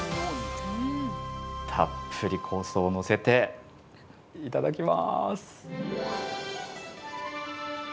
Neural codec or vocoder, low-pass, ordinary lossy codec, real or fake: none; none; none; real